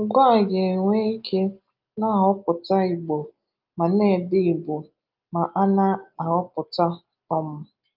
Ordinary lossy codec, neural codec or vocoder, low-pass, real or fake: Opus, 24 kbps; none; 5.4 kHz; real